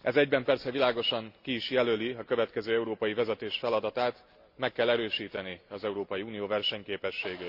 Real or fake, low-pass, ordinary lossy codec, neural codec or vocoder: real; 5.4 kHz; Opus, 64 kbps; none